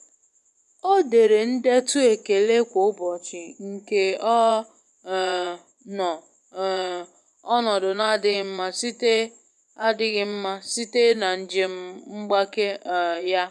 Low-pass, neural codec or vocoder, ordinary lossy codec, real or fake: none; vocoder, 24 kHz, 100 mel bands, Vocos; none; fake